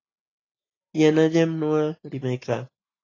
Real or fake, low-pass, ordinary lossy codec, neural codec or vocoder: real; 7.2 kHz; AAC, 32 kbps; none